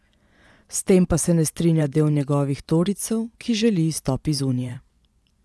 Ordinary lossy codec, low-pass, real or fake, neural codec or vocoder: none; none; fake; vocoder, 24 kHz, 100 mel bands, Vocos